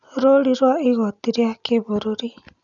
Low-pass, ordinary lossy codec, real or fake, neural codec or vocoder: 7.2 kHz; none; real; none